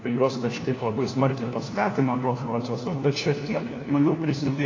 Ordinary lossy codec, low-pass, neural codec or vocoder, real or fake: AAC, 32 kbps; 7.2 kHz; codec, 16 kHz, 1 kbps, FunCodec, trained on LibriTTS, 50 frames a second; fake